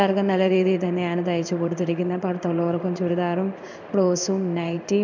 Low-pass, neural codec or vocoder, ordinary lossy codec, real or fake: 7.2 kHz; codec, 16 kHz in and 24 kHz out, 1 kbps, XY-Tokenizer; none; fake